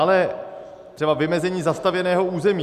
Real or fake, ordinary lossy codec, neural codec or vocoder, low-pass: real; Opus, 64 kbps; none; 14.4 kHz